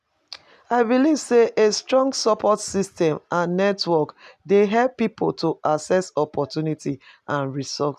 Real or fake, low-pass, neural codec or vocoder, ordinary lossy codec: real; 14.4 kHz; none; none